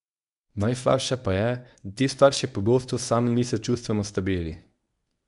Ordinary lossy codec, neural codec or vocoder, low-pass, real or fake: none; codec, 24 kHz, 0.9 kbps, WavTokenizer, medium speech release version 1; 10.8 kHz; fake